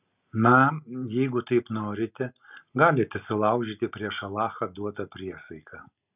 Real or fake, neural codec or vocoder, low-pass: real; none; 3.6 kHz